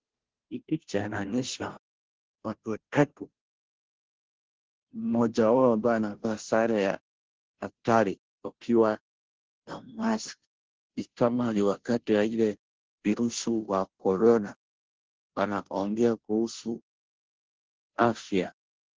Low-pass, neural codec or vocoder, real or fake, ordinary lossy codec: 7.2 kHz; codec, 16 kHz, 0.5 kbps, FunCodec, trained on Chinese and English, 25 frames a second; fake; Opus, 16 kbps